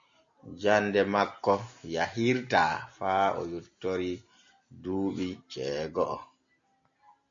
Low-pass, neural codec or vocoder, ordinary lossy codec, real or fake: 7.2 kHz; none; MP3, 64 kbps; real